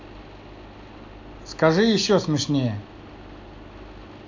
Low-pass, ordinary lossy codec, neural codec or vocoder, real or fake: 7.2 kHz; AAC, 48 kbps; none; real